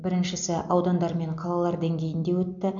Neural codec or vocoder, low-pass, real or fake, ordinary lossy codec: none; 7.2 kHz; real; none